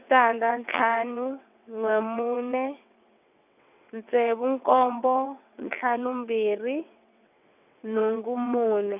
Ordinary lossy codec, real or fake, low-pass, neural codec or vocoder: none; fake; 3.6 kHz; vocoder, 22.05 kHz, 80 mel bands, WaveNeXt